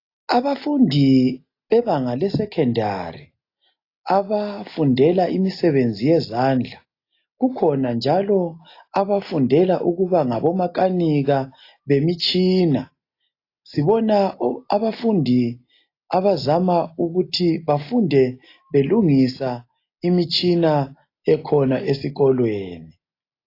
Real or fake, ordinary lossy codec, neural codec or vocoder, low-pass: real; AAC, 32 kbps; none; 5.4 kHz